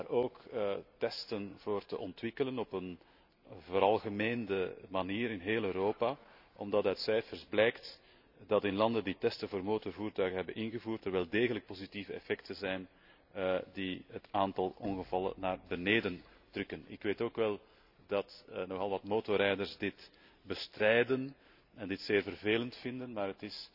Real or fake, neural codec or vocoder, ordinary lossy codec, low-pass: real; none; none; 5.4 kHz